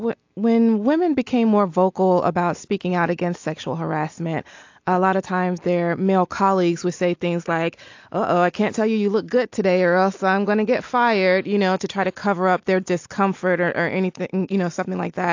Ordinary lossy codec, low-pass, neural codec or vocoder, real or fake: AAC, 48 kbps; 7.2 kHz; none; real